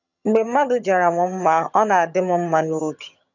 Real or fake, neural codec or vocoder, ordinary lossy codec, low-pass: fake; vocoder, 22.05 kHz, 80 mel bands, HiFi-GAN; none; 7.2 kHz